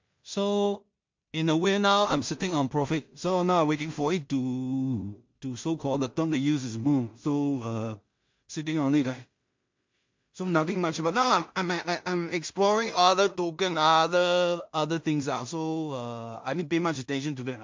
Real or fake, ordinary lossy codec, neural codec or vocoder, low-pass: fake; MP3, 48 kbps; codec, 16 kHz in and 24 kHz out, 0.4 kbps, LongCat-Audio-Codec, two codebook decoder; 7.2 kHz